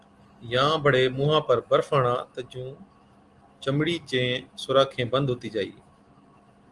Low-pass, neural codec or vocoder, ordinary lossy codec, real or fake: 10.8 kHz; none; Opus, 24 kbps; real